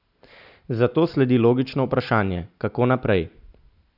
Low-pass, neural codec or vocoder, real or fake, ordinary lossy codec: 5.4 kHz; none; real; none